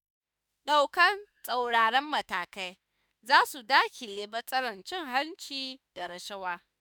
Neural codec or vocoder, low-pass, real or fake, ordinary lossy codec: autoencoder, 48 kHz, 32 numbers a frame, DAC-VAE, trained on Japanese speech; none; fake; none